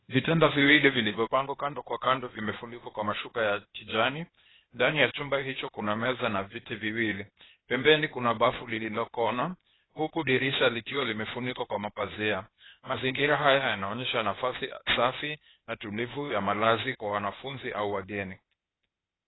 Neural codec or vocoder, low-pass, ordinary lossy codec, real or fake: codec, 16 kHz, 0.8 kbps, ZipCodec; 7.2 kHz; AAC, 16 kbps; fake